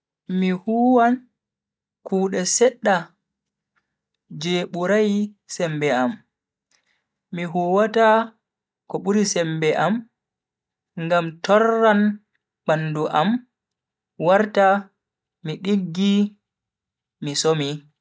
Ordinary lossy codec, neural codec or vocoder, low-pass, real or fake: none; none; none; real